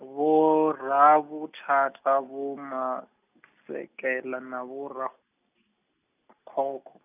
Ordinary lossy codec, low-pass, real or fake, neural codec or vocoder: none; 3.6 kHz; real; none